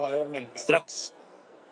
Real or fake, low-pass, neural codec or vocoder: fake; 9.9 kHz; codec, 44.1 kHz, 2.6 kbps, SNAC